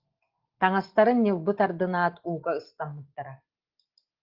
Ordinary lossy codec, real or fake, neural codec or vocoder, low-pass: Opus, 16 kbps; real; none; 5.4 kHz